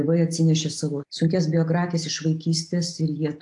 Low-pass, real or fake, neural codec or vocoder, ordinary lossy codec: 10.8 kHz; real; none; AAC, 64 kbps